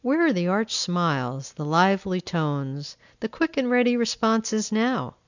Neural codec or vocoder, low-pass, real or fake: none; 7.2 kHz; real